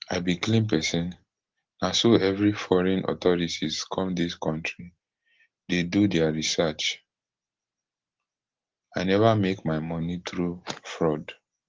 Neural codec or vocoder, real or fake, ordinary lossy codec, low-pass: none; real; Opus, 16 kbps; 7.2 kHz